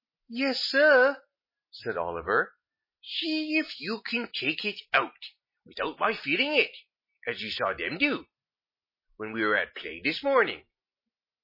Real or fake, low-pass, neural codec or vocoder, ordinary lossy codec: real; 5.4 kHz; none; MP3, 24 kbps